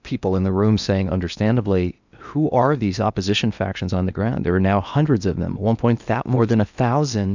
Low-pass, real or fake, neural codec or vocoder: 7.2 kHz; fake; codec, 16 kHz in and 24 kHz out, 0.8 kbps, FocalCodec, streaming, 65536 codes